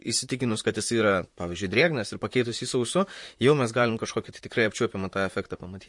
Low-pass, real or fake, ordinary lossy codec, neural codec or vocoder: 10.8 kHz; fake; MP3, 48 kbps; vocoder, 44.1 kHz, 128 mel bands, Pupu-Vocoder